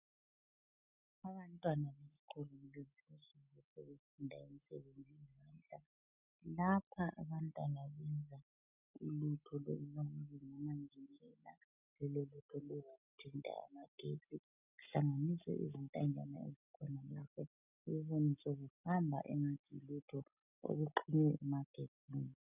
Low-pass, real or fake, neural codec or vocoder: 3.6 kHz; real; none